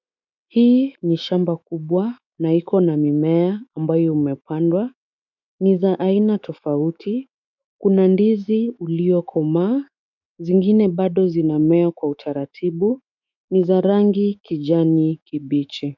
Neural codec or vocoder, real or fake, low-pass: autoencoder, 48 kHz, 128 numbers a frame, DAC-VAE, trained on Japanese speech; fake; 7.2 kHz